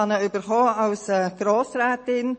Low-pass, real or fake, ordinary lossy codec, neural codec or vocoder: 9.9 kHz; real; MP3, 32 kbps; none